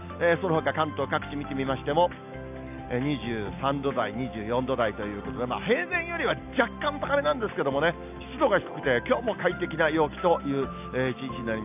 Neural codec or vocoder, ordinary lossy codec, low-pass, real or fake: none; none; 3.6 kHz; real